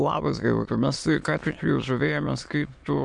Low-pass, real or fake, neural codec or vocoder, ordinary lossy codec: 9.9 kHz; fake; autoencoder, 22.05 kHz, a latent of 192 numbers a frame, VITS, trained on many speakers; MP3, 64 kbps